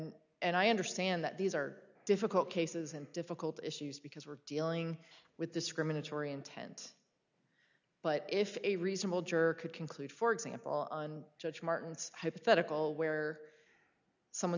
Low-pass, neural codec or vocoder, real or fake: 7.2 kHz; none; real